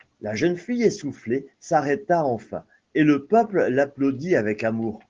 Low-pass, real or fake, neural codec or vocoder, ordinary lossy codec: 7.2 kHz; real; none; Opus, 16 kbps